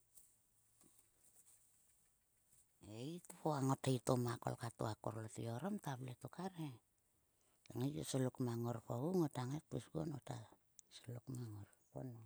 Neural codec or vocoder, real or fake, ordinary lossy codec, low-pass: none; real; none; none